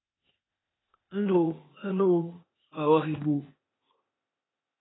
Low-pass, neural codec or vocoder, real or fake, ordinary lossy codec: 7.2 kHz; codec, 16 kHz, 0.8 kbps, ZipCodec; fake; AAC, 16 kbps